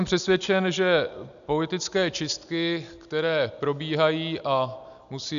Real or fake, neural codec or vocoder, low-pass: real; none; 7.2 kHz